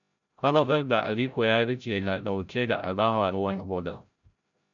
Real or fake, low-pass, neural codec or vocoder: fake; 7.2 kHz; codec, 16 kHz, 0.5 kbps, FreqCodec, larger model